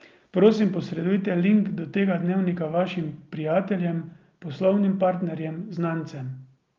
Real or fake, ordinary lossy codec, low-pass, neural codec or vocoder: real; Opus, 32 kbps; 7.2 kHz; none